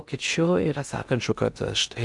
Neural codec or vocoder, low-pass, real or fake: codec, 16 kHz in and 24 kHz out, 0.6 kbps, FocalCodec, streaming, 2048 codes; 10.8 kHz; fake